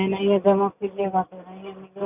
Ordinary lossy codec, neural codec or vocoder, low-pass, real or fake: none; none; 3.6 kHz; real